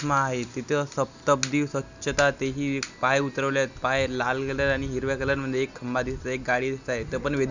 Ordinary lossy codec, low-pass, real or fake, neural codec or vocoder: none; 7.2 kHz; real; none